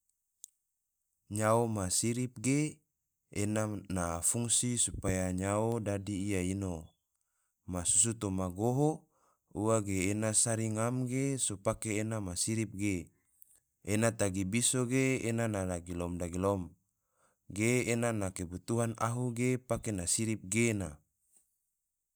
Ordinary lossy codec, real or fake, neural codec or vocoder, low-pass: none; real; none; none